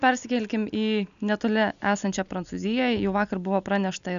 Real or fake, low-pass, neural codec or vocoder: real; 7.2 kHz; none